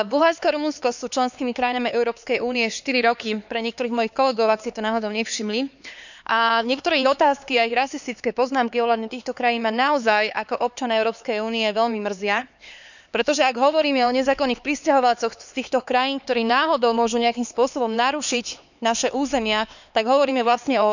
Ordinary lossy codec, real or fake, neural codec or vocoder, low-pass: none; fake; codec, 16 kHz, 4 kbps, X-Codec, HuBERT features, trained on LibriSpeech; 7.2 kHz